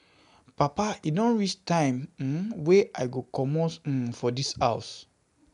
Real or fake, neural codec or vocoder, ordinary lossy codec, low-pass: real; none; none; 10.8 kHz